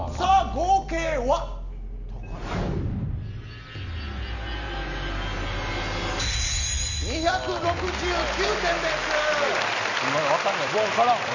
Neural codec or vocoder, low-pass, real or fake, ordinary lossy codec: none; 7.2 kHz; real; none